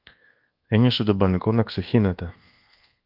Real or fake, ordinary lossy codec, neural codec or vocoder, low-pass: fake; Opus, 32 kbps; codec, 24 kHz, 1.2 kbps, DualCodec; 5.4 kHz